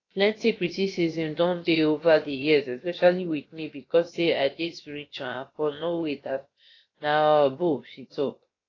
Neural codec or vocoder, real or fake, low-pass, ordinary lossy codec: codec, 16 kHz, about 1 kbps, DyCAST, with the encoder's durations; fake; 7.2 kHz; AAC, 32 kbps